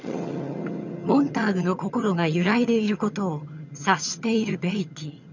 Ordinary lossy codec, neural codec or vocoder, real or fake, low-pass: none; vocoder, 22.05 kHz, 80 mel bands, HiFi-GAN; fake; 7.2 kHz